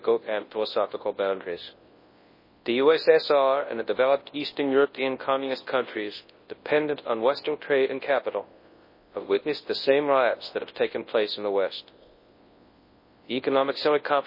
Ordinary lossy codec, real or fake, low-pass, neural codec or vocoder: MP3, 24 kbps; fake; 5.4 kHz; codec, 24 kHz, 0.9 kbps, WavTokenizer, large speech release